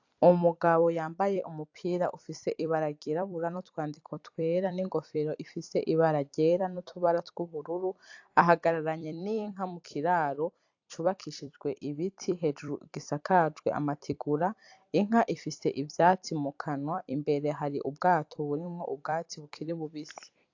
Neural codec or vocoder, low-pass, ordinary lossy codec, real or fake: vocoder, 44.1 kHz, 128 mel bands every 256 samples, BigVGAN v2; 7.2 kHz; AAC, 48 kbps; fake